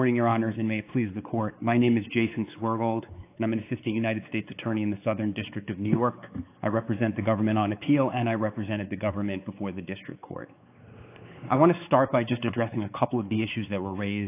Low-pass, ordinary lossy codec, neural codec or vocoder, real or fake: 3.6 kHz; AAC, 24 kbps; codec, 16 kHz, 4 kbps, X-Codec, WavLM features, trained on Multilingual LibriSpeech; fake